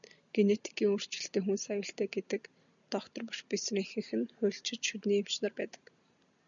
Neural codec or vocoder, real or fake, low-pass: none; real; 7.2 kHz